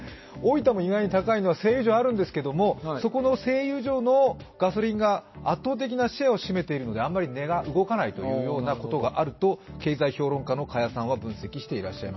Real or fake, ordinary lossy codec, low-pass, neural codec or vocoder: real; MP3, 24 kbps; 7.2 kHz; none